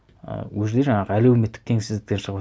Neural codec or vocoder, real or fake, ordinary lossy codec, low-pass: none; real; none; none